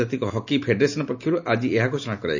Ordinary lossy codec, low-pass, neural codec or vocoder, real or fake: none; 7.2 kHz; none; real